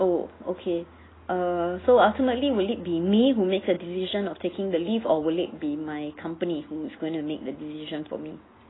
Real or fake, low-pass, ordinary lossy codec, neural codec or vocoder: real; 7.2 kHz; AAC, 16 kbps; none